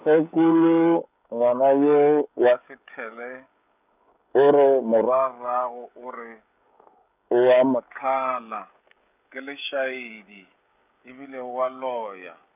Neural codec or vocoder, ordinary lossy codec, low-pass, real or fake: codec, 16 kHz, 16 kbps, FreqCodec, smaller model; none; 3.6 kHz; fake